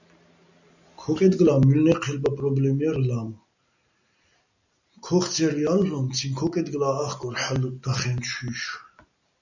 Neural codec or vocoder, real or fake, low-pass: none; real; 7.2 kHz